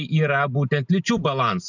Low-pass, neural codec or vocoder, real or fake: 7.2 kHz; none; real